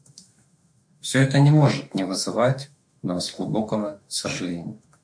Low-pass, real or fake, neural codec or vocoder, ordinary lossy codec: 9.9 kHz; fake; autoencoder, 48 kHz, 32 numbers a frame, DAC-VAE, trained on Japanese speech; MP3, 64 kbps